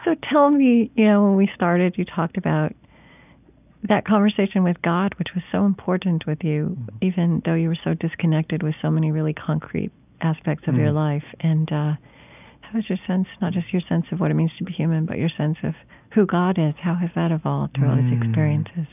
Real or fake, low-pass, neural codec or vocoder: real; 3.6 kHz; none